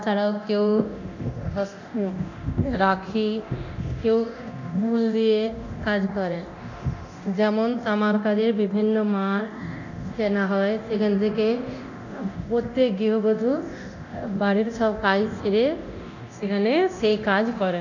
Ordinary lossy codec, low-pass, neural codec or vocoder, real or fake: none; 7.2 kHz; codec, 24 kHz, 0.9 kbps, DualCodec; fake